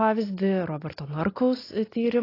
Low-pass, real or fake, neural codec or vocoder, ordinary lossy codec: 5.4 kHz; real; none; AAC, 24 kbps